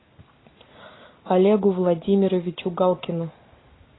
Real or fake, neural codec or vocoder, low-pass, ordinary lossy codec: real; none; 7.2 kHz; AAC, 16 kbps